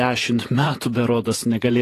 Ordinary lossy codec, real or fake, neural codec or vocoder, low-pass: AAC, 48 kbps; fake; vocoder, 48 kHz, 128 mel bands, Vocos; 14.4 kHz